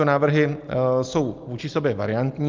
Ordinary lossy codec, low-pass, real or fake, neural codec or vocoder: Opus, 32 kbps; 7.2 kHz; real; none